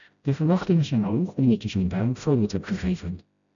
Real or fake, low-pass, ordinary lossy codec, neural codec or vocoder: fake; 7.2 kHz; MP3, 96 kbps; codec, 16 kHz, 0.5 kbps, FreqCodec, smaller model